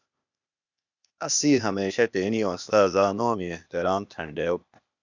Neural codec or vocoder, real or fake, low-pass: codec, 16 kHz, 0.8 kbps, ZipCodec; fake; 7.2 kHz